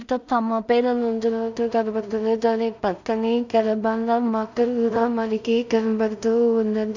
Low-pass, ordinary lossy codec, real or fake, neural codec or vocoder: 7.2 kHz; none; fake; codec, 16 kHz in and 24 kHz out, 0.4 kbps, LongCat-Audio-Codec, two codebook decoder